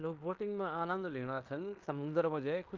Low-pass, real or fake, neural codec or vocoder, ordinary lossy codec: 7.2 kHz; fake; codec, 16 kHz in and 24 kHz out, 0.9 kbps, LongCat-Audio-Codec, fine tuned four codebook decoder; Opus, 32 kbps